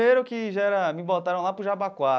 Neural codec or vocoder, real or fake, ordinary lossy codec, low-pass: none; real; none; none